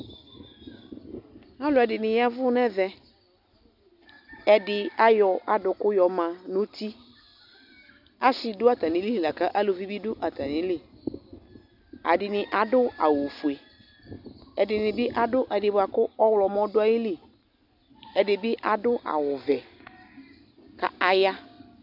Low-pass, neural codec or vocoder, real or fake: 5.4 kHz; none; real